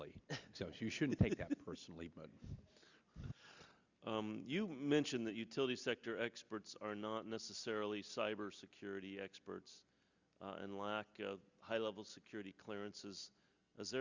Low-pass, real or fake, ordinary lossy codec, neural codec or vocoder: 7.2 kHz; real; Opus, 64 kbps; none